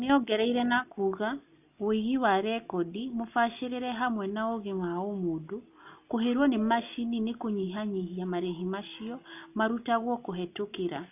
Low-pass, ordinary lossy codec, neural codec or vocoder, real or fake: 3.6 kHz; none; none; real